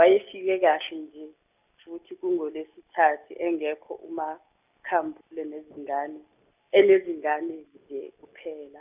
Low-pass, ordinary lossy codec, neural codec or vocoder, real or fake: 3.6 kHz; none; none; real